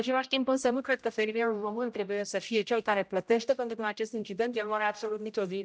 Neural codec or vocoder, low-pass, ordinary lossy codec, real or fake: codec, 16 kHz, 0.5 kbps, X-Codec, HuBERT features, trained on general audio; none; none; fake